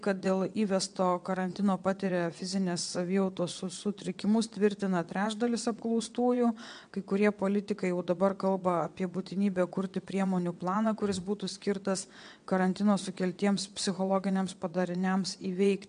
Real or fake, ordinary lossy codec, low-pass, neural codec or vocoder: fake; MP3, 64 kbps; 9.9 kHz; vocoder, 22.05 kHz, 80 mel bands, WaveNeXt